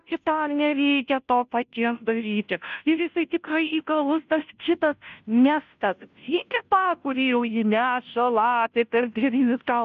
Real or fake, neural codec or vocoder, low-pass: fake; codec, 16 kHz, 0.5 kbps, FunCodec, trained on Chinese and English, 25 frames a second; 7.2 kHz